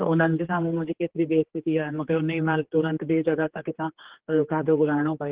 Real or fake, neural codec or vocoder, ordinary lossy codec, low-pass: fake; codec, 16 kHz, 4 kbps, X-Codec, HuBERT features, trained on general audio; Opus, 16 kbps; 3.6 kHz